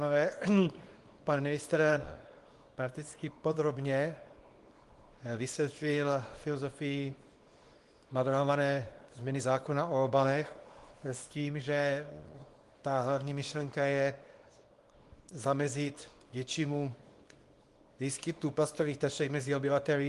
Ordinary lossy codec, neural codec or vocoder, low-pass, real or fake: Opus, 24 kbps; codec, 24 kHz, 0.9 kbps, WavTokenizer, small release; 10.8 kHz; fake